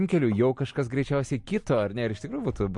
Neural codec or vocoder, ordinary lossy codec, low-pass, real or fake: none; MP3, 48 kbps; 10.8 kHz; real